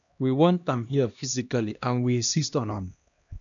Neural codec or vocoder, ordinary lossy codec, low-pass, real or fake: codec, 16 kHz, 1 kbps, X-Codec, HuBERT features, trained on LibriSpeech; none; 7.2 kHz; fake